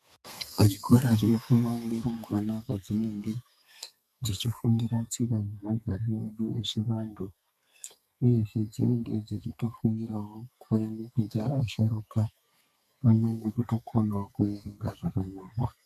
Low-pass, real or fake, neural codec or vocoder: 14.4 kHz; fake; codec, 32 kHz, 1.9 kbps, SNAC